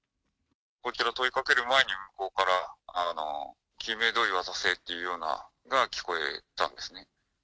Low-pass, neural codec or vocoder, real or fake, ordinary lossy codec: none; none; real; none